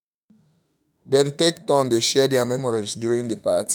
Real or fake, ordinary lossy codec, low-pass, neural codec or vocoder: fake; none; none; autoencoder, 48 kHz, 32 numbers a frame, DAC-VAE, trained on Japanese speech